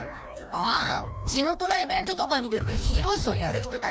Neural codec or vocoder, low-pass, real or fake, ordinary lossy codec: codec, 16 kHz, 1 kbps, FreqCodec, larger model; none; fake; none